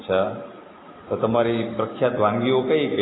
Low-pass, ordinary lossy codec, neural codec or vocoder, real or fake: 7.2 kHz; AAC, 16 kbps; none; real